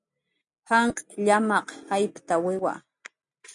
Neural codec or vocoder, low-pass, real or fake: none; 10.8 kHz; real